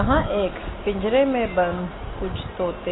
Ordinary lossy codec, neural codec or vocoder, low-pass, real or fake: AAC, 16 kbps; none; 7.2 kHz; real